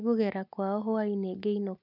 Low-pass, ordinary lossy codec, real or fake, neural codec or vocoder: 5.4 kHz; none; real; none